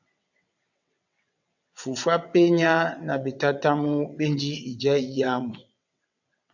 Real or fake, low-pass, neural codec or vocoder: fake; 7.2 kHz; vocoder, 22.05 kHz, 80 mel bands, WaveNeXt